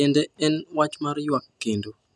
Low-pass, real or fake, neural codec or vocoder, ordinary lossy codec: none; real; none; none